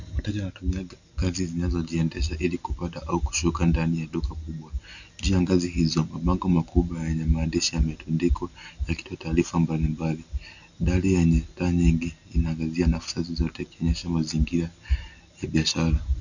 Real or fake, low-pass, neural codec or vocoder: real; 7.2 kHz; none